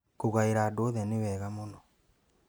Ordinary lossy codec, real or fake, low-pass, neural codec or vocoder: none; real; none; none